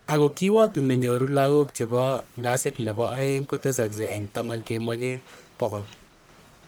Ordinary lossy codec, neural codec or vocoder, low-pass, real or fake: none; codec, 44.1 kHz, 1.7 kbps, Pupu-Codec; none; fake